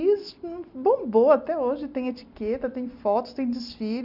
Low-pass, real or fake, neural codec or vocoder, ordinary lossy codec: 5.4 kHz; real; none; none